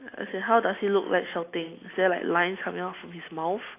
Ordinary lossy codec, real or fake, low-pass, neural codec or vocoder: AAC, 24 kbps; real; 3.6 kHz; none